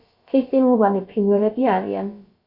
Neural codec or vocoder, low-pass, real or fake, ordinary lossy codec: codec, 16 kHz, about 1 kbps, DyCAST, with the encoder's durations; 5.4 kHz; fake; Opus, 64 kbps